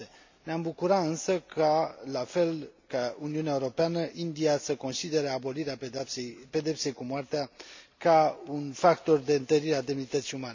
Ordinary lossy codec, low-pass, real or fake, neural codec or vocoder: MP3, 64 kbps; 7.2 kHz; real; none